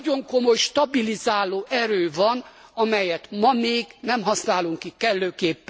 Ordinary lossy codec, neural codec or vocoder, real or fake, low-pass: none; none; real; none